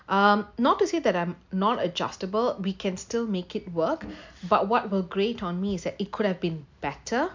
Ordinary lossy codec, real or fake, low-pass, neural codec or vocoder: MP3, 64 kbps; real; 7.2 kHz; none